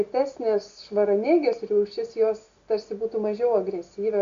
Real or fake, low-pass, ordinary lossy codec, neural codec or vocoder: real; 7.2 kHz; AAC, 64 kbps; none